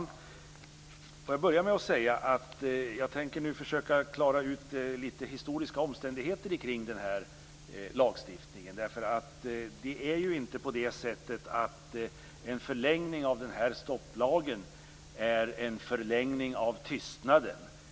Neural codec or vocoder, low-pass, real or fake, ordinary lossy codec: none; none; real; none